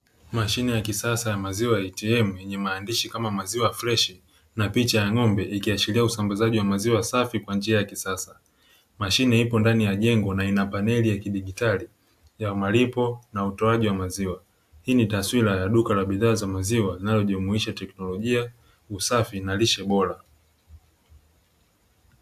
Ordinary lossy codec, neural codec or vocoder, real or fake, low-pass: AAC, 96 kbps; none; real; 14.4 kHz